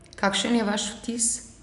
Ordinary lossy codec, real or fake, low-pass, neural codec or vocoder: none; real; 10.8 kHz; none